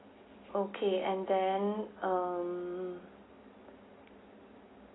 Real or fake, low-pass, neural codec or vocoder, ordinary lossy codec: real; 7.2 kHz; none; AAC, 16 kbps